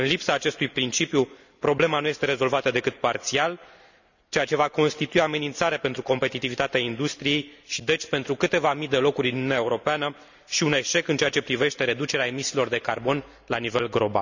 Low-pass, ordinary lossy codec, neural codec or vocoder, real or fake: 7.2 kHz; none; none; real